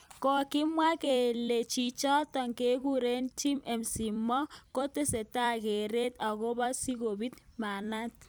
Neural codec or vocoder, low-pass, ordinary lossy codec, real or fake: vocoder, 44.1 kHz, 128 mel bands every 256 samples, BigVGAN v2; none; none; fake